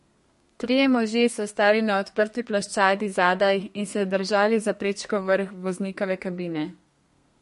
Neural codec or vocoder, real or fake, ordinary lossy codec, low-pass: codec, 32 kHz, 1.9 kbps, SNAC; fake; MP3, 48 kbps; 14.4 kHz